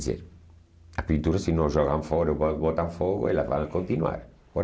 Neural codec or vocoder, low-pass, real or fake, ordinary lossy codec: none; none; real; none